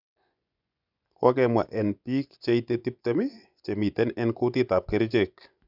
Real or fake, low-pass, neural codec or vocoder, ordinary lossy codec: fake; 5.4 kHz; vocoder, 24 kHz, 100 mel bands, Vocos; none